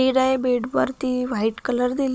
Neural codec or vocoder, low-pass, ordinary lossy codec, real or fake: codec, 16 kHz, 16 kbps, FunCodec, trained on Chinese and English, 50 frames a second; none; none; fake